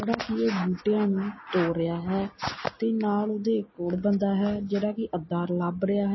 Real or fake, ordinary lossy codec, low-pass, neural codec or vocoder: real; MP3, 24 kbps; 7.2 kHz; none